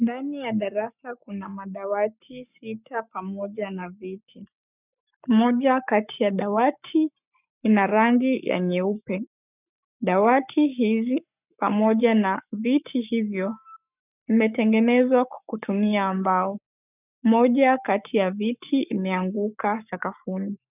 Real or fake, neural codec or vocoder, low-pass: fake; codec, 44.1 kHz, 7.8 kbps, Pupu-Codec; 3.6 kHz